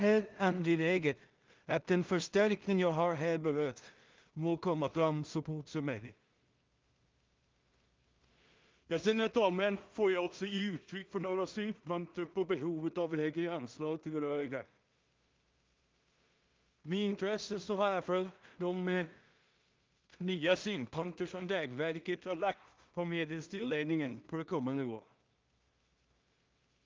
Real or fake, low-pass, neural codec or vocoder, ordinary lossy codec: fake; 7.2 kHz; codec, 16 kHz in and 24 kHz out, 0.4 kbps, LongCat-Audio-Codec, two codebook decoder; Opus, 24 kbps